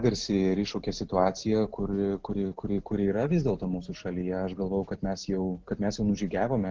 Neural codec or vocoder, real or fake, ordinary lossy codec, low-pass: none; real; Opus, 16 kbps; 7.2 kHz